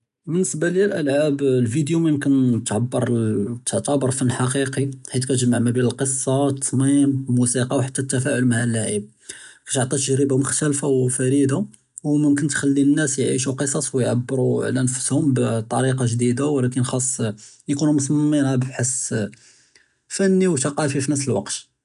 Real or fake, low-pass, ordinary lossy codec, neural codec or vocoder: real; 10.8 kHz; none; none